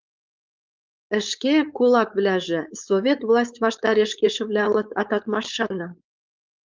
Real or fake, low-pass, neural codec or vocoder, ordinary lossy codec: fake; 7.2 kHz; codec, 16 kHz, 4.8 kbps, FACodec; Opus, 24 kbps